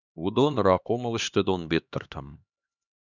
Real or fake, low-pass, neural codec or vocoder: fake; 7.2 kHz; codec, 16 kHz, 2 kbps, X-Codec, HuBERT features, trained on LibriSpeech